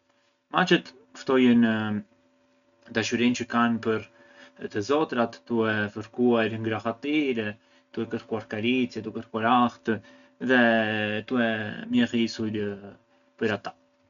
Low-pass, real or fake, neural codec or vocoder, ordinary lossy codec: 7.2 kHz; real; none; none